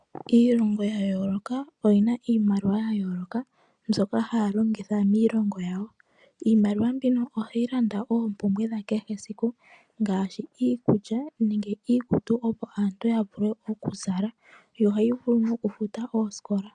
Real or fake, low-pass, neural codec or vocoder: real; 9.9 kHz; none